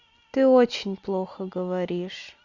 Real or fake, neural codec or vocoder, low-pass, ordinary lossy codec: real; none; 7.2 kHz; Opus, 64 kbps